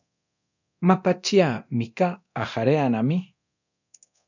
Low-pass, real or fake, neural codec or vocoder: 7.2 kHz; fake; codec, 24 kHz, 0.9 kbps, DualCodec